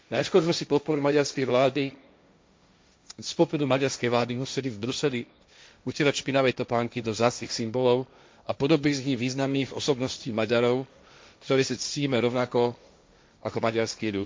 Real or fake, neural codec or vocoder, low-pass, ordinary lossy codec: fake; codec, 16 kHz, 1.1 kbps, Voila-Tokenizer; none; none